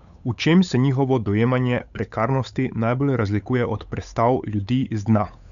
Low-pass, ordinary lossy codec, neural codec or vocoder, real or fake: 7.2 kHz; none; codec, 16 kHz, 8 kbps, FunCodec, trained on Chinese and English, 25 frames a second; fake